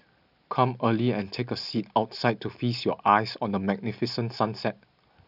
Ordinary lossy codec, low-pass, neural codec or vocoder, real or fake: none; 5.4 kHz; none; real